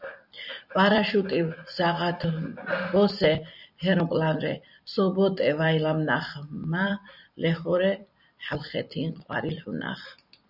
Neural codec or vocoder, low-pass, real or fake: vocoder, 44.1 kHz, 128 mel bands every 256 samples, BigVGAN v2; 5.4 kHz; fake